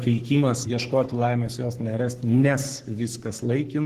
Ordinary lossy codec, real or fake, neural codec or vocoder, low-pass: Opus, 16 kbps; fake; codec, 44.1 kHz, 3.4 kbps, Pupu-Codec; 14.4 kHz